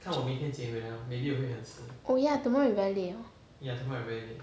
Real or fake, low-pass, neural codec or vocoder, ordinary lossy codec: real; none; none; none